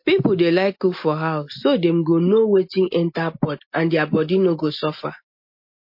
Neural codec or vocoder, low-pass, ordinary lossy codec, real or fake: none; 5.4 kHz; MP3, 32 kbps; real